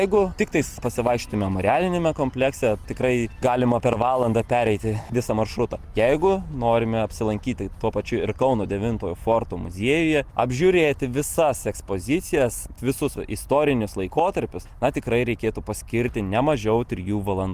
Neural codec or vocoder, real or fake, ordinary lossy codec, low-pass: none; real; Opus, 24 kbps; 14.4 kHz